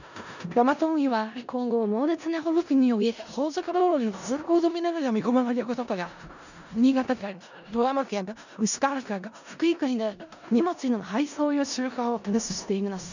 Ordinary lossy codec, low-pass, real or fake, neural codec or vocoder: none; 7.2 kHz; fake; codec, 16 kHz in and 24 kHz out, 0.4 kbps, LongCat-Audio-Codec, four codebook decoder